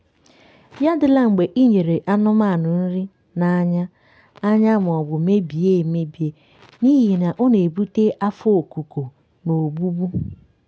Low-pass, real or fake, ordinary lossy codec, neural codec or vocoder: none; real; none; none